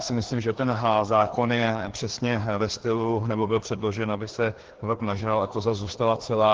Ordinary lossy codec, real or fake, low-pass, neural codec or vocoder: Opus, 16 kbps; fake; 7.2 kHz; codec, 16 kHz, 2 kbps, FreqCodec, larger model